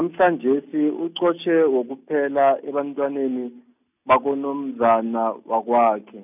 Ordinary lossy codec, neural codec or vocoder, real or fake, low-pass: none; none; real; 3.6 kHz